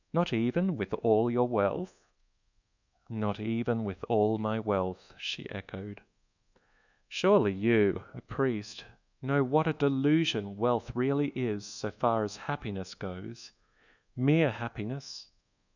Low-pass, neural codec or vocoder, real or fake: 7.2 kHz; codec, 24 kHz, 1.2 kbps, DualCodec; fake